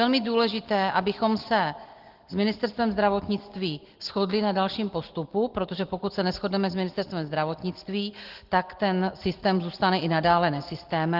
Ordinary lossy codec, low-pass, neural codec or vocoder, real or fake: Opus, 16 kbps; 5.4 kHz; none; real